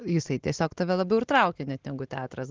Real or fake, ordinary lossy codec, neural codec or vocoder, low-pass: real; Opus, 16 kbps; none; 7.2 kHz